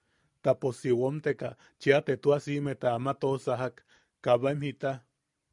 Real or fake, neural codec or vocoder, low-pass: real; none; 10.8 kHz